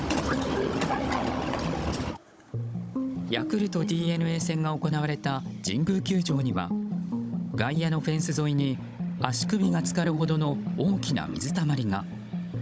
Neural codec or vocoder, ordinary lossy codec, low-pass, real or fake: codec, 16 kHz, 16 kbps, FunCodec, trained on Chinese and English, 50 frames a second; none; none; fake